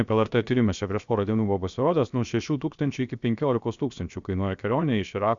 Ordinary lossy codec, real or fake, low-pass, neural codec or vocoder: Opus, 64 kbps; fake; 7.2 kHz; codec, 16 kHz, 0.7 kbps, FocalCodec